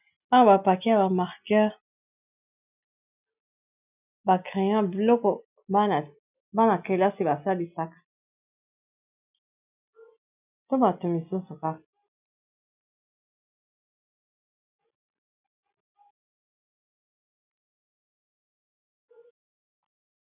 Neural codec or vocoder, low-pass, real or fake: none; 3.6 kHz; real